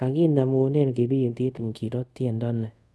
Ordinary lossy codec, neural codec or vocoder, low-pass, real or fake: none; codec, 24 kHz, 0.5 kbps, DualCodec; none; fake